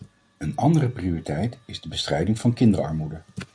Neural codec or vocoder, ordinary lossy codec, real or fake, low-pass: none; MP3, 64 kbps; real; 9.9 kHz